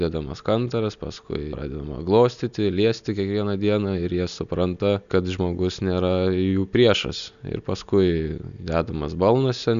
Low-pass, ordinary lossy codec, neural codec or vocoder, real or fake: 7.2 kHz; MP3, 96 kbps; none; real